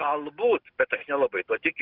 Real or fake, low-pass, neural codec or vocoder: real; 5.4 kHz; none